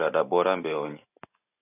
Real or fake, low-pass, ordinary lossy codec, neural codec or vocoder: real; 3.6 kHz; AAC, 16 kbps; none